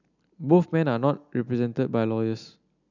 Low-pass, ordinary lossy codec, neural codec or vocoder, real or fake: 7.2 kHz; none; none; real